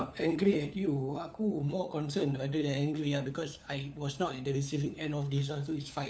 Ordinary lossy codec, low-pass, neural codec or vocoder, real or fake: none; none; codec, 16 kHz, 4 kbps, FunCodec, trained on LibriTTS, 50 frames a second; fake